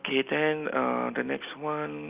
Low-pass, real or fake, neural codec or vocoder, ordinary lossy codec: 3.6 kHz; real; none; Opus, 24 kbps